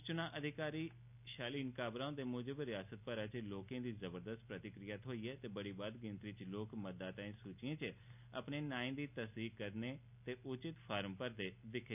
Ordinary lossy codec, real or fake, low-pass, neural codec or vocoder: none; real; 3.6 kHz; none